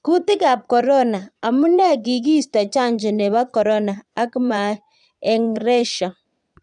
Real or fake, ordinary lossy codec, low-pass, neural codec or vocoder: fake; none; 9.9 kHz; vocoder, 22.05 kHz, 80 mel bands, Vocos